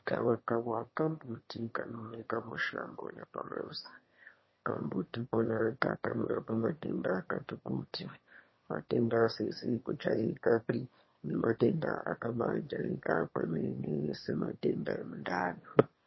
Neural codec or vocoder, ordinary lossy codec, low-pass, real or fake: autoencoder, 22.05 kHz, a latent of 192 numbers a frame, VITS, trained on one speaker; MP3, 24 kbps; 7.2 kHz; fake